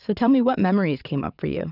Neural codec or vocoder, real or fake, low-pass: vocoder, 22.05 kHz, 80 mel bands, WaveNeXt; fake; 5.4 kHz